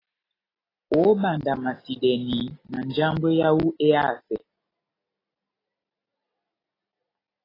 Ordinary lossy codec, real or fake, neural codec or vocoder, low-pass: AAC, 24 kbps; real; none; 5.4 kHz